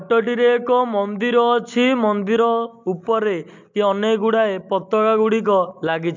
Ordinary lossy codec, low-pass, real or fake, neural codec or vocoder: MP3, 64 kbps; 7.2 kHz; real; none